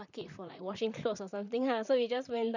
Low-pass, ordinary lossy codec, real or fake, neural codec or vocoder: 7.2 kHz; none; fake; vocoder, 44.1 kHz, 128 mel bands, Pupu-Vocoder